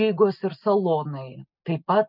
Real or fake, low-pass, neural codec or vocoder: real; 5.4 kHz; none